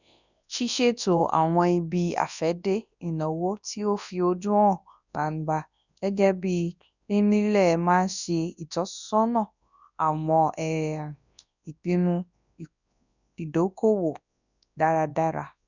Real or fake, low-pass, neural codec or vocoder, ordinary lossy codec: fake; 7.2 kHz; codec, 24 kHz, 0.9 kbps, WavTokenizer, large speech release; none